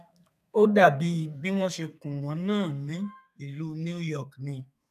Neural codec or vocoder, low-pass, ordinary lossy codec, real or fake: codec, 32 kHz, 1.9 kbps, SNAC; 14.4 kHz; none; fake